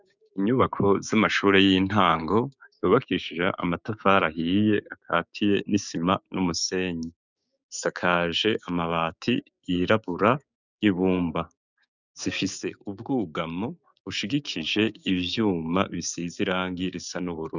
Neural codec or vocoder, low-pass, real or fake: codec, 16 kHz, 6 kbps, DAC; 7.2 kHz; fake